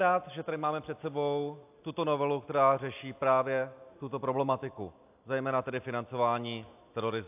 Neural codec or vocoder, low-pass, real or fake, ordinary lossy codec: none; 3.6 kHz; real; AAC, 32 kbps